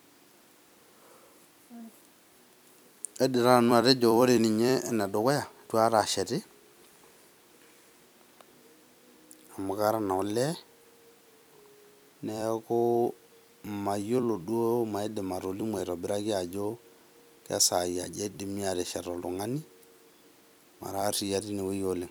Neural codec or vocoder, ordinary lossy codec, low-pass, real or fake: vocoder, 44.1 kHz, 128 mel bands every 256 samples, BigVGAN v2; none; none; fake